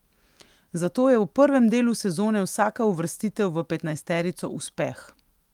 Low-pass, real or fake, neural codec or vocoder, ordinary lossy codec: 19.8 kHz; fake; autoencoder, 48 kHz, 128 numbers a frame, DAC-VAE, trained on Japanese speech; Opus, 32 kbps